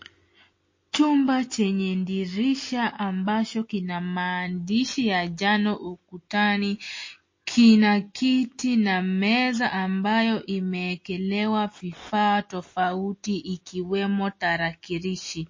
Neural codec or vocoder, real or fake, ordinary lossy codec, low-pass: none; real; MP3, 32 kbps; 7.2 kHz